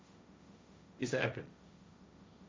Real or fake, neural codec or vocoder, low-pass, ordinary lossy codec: fake; codec, 16 kHz, 1.1 kbps, Voila-Tokenizer; none; none